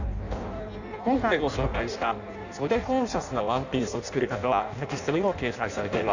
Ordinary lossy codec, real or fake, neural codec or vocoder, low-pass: none; fake; codec, 16 kHz in and 24 kHz out, 0.6 kbps, FireRedTTS-2 codec; 7.2 kHz